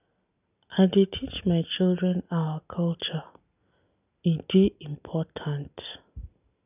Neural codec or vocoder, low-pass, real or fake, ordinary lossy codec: none; 3.6 kHz; real; none